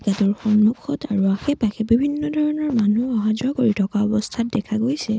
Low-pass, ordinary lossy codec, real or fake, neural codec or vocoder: none; none; real; none